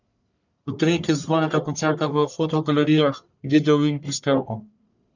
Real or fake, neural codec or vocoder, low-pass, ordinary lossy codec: fake; codec, 44.1 kHz, 1.7 kbps, Pupu-Codec; 7.2 kHz; none